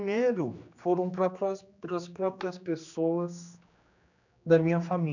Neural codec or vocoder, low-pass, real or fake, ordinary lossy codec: codec, 16 kHz, 2 kbps, X-Codec, HuBERT features, trained on general audio; 7.2 kHz; fake; none